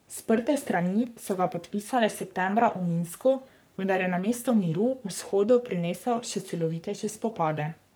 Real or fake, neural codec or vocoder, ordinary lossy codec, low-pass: fake; codec, 44.1 kHz, 3.4 kbps, Pupu-Codec; none; none